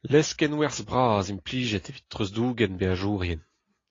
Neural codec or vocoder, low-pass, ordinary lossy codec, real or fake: none; 7.2 kHz; AAC, 32 kbps; real